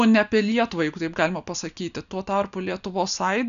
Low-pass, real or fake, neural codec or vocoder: 7.2 kHz; real; none